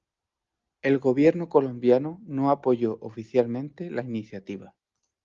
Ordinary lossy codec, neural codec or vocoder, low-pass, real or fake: Opus, 32 kbps; none; 7.2 kHz; real